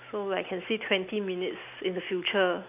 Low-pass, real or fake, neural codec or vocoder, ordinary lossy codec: 3.6 kHz; real; none; none